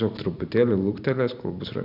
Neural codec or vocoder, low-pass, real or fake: vocoder, 44.1 kHz, 128 mel bands every 256 samples, BigVGAN v2; 5.4 kHz; fake